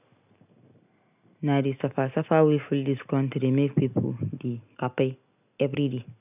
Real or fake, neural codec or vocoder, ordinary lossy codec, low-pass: real; none; none; 3.6 kHz